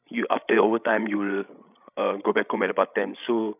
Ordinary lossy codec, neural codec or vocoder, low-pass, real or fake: none; codec, 16 kHz, 16 kbps, FreqCodec, larger model; 3.6 kHz; fake